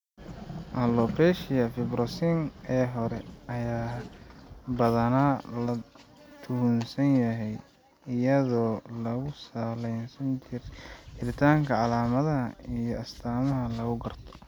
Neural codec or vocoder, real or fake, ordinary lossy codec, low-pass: none; real; none; 19.8 kHz